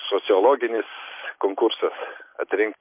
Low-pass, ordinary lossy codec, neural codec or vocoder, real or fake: 3.6 kHz; MP3, 24 kbps; none; real